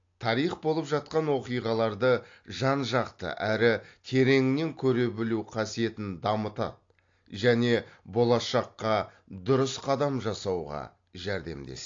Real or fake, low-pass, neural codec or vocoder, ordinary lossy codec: real; 7.2 kHz; none; AAC, 48 kbps